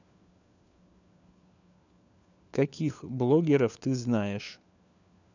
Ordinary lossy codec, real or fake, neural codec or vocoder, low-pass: none; fake; codec, 16 kHz, 4 kbps, FunCodec, trained on LibriTTS, 50 frames a second; 7.2 kHz